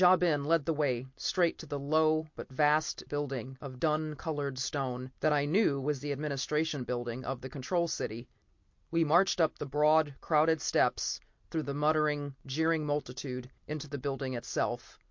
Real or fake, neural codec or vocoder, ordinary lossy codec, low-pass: real; none; MP3, 48 kbps; 7.2 kHz